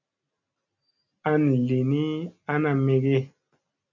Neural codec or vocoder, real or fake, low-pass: none; real; 7.2 kHz